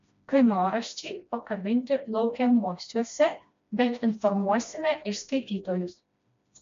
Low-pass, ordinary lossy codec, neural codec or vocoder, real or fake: 7.2 kHz; MP3, 48 kbps; codec, 16 kHz, 1 kbps, FreqCodec, smaller model; fake